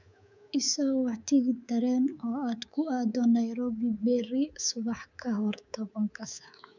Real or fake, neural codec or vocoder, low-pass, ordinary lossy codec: fake; codec, 24 kHz, 3.1 kbps, DualCodec; 7.2 kHz; none